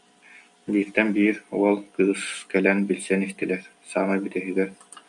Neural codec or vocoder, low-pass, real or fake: none; 10.8 kHz; real